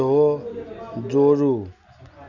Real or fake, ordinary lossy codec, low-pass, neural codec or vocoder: real; none; 7.2 kHz; none